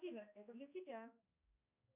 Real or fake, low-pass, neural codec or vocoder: fake; 3.6 kHz; codec, 16 kHz, 1 kbps, X-Codec, HuBERT features, trained on balanced general audio